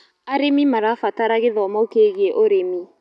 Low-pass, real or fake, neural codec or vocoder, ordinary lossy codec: none; real; none; none